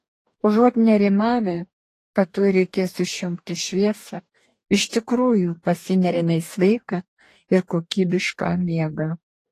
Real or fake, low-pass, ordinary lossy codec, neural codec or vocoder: fake; 14.4 kHz; AAC, 48 kbps; codec, 44.1 kHz, 2.6 kbps, DAC